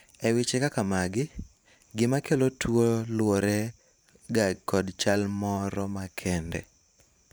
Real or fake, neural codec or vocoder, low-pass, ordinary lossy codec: fake; vocoder, 44.1 kHz, 128 mel bands every 512 samples, BigVGAN v2; none; none